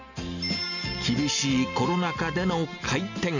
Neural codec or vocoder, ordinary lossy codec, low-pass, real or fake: none; none; 7.2 kHz; real